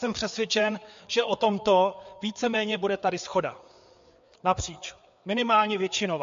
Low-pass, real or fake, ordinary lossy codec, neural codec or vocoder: 7.2 kHz; fake; MP3, 48 kbps; codec, 16 kHz, 4 kbps, FreqCodec, larger model